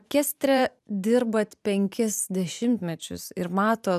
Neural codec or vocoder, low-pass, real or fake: vocoder, 44.1 kHz, 128 mel bands every 512 samples, BigVGAN v2; 14.4 kHz; fake